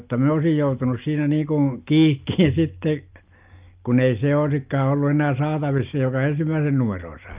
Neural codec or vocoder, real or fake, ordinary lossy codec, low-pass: none; real; Opus, 24 kbps; 3.6 kHz